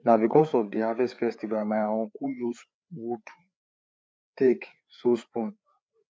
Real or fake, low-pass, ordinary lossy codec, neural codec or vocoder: fake; none; none; codec, 16 kHz, 4 kbps, FreqCodec, larger model